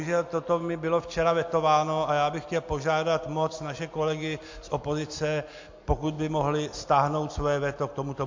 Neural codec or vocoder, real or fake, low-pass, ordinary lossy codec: none; real; 7.2 kHz; MP3, 48 kbps